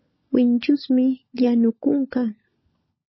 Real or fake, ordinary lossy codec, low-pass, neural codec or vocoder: fake; MP3, 24 kbps; 7.2 kHz; codec, 16 kHz, 16 kbps, FunCodec, trained on LibriTTS, 50 frames a second